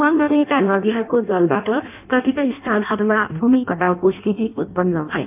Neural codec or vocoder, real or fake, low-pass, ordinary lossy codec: codec, 16 kHz in and 24 kHz out, 0.6 kbps, FireRedTTS-2 codec; fake; 3.6 kHz; none